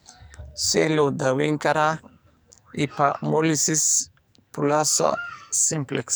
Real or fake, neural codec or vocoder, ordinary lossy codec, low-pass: fake; codec, 44.1 kHz, 2.6 kbps, SNAC; none; none